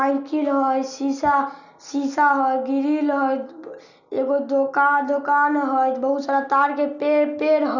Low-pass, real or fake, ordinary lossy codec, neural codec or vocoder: 7.2 kHz; real; none; none